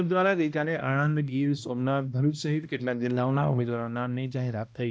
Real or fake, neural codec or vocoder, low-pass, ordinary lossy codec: fake; codec, 16 kHz, 1 kbps, X-Codec, HuBERT features, trained on balanced general audio; none; none